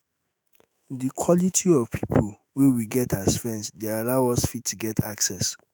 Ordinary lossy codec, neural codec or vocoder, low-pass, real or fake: none; autoencoder, 48 kHz, 128 numbers a frame, DAC-VAE, trained on Japanese speech; none; fake